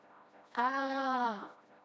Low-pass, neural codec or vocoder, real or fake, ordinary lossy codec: none; codec, 16 kHz, 1 kbps, FreqCodec, smaller model; fake; none